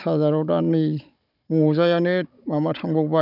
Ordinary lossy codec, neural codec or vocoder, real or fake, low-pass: none; codec, 16 kHz, 16 kbps, FunCodec, trained on Chinese and English, 50 frames a second; fake; 5.4 kHz